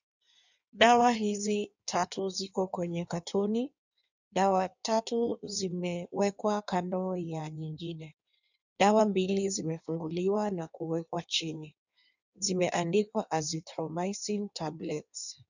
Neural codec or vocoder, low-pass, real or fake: codec, 16 kHz in and 24 kHz out, 1.1 kbps, FireRedTTS-2 codec; 7.2 kHz; fake